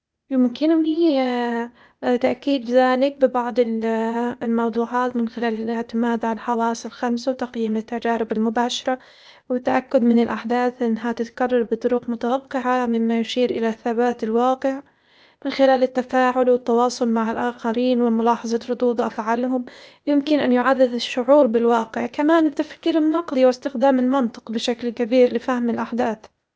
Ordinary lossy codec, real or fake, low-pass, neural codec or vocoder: none; fake; none; codec, 16 kHz, 0.8 kbps, ZipCodec